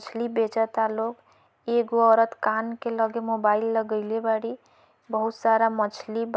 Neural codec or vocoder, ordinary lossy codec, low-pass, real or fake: none; none; none; real